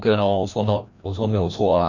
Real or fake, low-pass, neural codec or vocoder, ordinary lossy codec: fake; 7.2 kHz; codec, 16 kHz, 1 kbps, FreqCodec, larger model; none